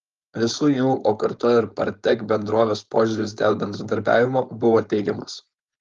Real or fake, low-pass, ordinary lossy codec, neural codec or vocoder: fake; 7.2 kHz; Opus, 32 kbps; codec, 16 kHz, 4.8 kbps, FACodec